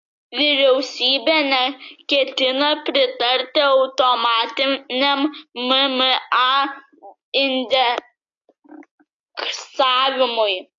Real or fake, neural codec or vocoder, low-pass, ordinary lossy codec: real; none; 7.2 kHz; AAC, 48 kbps